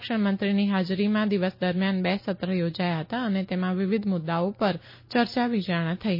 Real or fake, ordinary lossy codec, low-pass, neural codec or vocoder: real; MP3, 24 kbps; 5.4 kHz; none